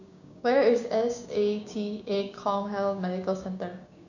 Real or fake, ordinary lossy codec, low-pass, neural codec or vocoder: fake; none; 7.2 kHz; codec, 16 kHz, 6 kbps, DAC